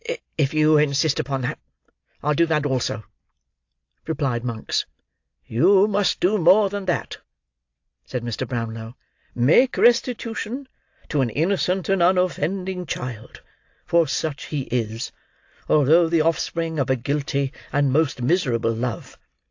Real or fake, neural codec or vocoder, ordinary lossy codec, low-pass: real; none; AAC, 48 kbps; 7.2 kHz